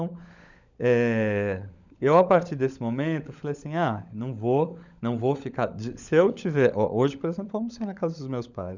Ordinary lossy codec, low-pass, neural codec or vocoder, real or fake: none; 7.2 kHz; codec, 16 kHz, 16 kbps, FunCodec, trained on LibriTTS, 50 frames a second; fake